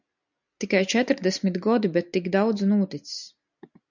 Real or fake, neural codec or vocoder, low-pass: real; none; 7.2 kHz